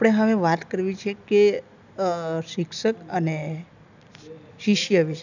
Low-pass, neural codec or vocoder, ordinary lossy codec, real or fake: 7.2 kHz; none; none; real